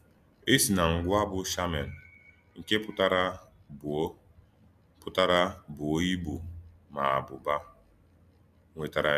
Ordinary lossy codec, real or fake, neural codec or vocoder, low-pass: none; real; none; 14.4 kHz